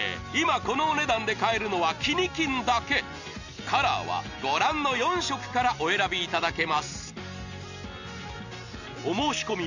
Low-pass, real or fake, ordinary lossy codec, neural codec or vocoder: 7.2 kHz; real; none; none